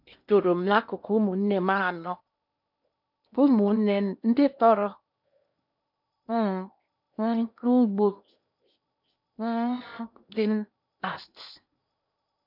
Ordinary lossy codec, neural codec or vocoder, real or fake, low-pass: none; codec, 16 kHz in and 24 kHz out, 0.8 kbps, FocalCodec, streaming, 65536 codes; fake; 5.4 kHz